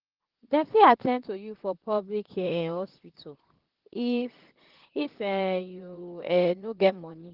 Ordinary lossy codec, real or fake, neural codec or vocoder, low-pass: Opus, 16 kbps; fake; vocoder, 44.1 kHz, 128 mel bands, Pupu-Vocoder; 5.4 kHz